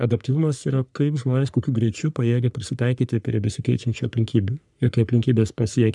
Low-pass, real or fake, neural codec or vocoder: 10.8 kHz; fake; codec, 44.1 kHz, 3.4 kbps, Pupu-Codec